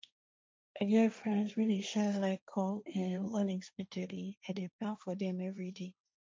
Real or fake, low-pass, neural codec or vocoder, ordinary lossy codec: fake; none; codec, 16 kHz, 1.1 kbps, Voila-Tokenizer; none